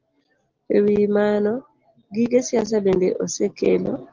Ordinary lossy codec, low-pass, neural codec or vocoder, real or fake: Opus, 16 kbps; 7.2 kHz; none; real